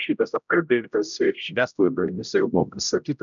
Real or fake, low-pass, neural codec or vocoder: fake; 7.2 kHz; codec, 16 kHz, 0.5 kbps, X-Codec, HuBERT features, trained on general audio